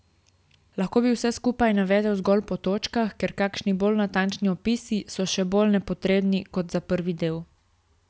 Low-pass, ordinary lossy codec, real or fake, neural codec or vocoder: none; none; real; none